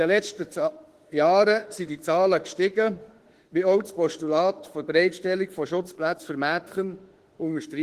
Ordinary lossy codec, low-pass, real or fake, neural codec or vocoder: Opus, 16 kbps; 14.4 kHz; fake; autoencoder, 48 kHz, 32 numbers a frame, DAC-VAE, trained on Japanese speech